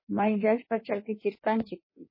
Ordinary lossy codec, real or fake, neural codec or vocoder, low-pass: MP3, 24 kbps; fake; codec, 16 kHz in and 24 kHz out, 1.1 kbps, FireRedTTS-2 codec; 5.4 kHz